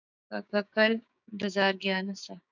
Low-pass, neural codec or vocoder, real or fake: 7.2 kHz; codec, 16 kHz, 6 kbps, DAC; fake